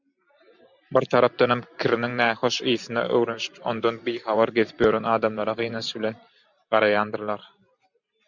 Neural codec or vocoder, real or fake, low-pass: vocoder, 44.1 kHz, 128 mel bands every 512 samples, BigVGAN v2; fake; 7.2 kHz